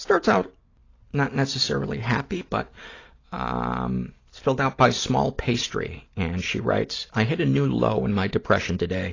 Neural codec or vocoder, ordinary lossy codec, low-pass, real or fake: none; AAC, 32 kbps; 7.2 kHz; real